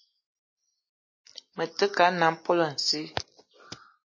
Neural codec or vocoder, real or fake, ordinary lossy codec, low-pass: none; real; MP3, 32 kbps; 7.2 kHz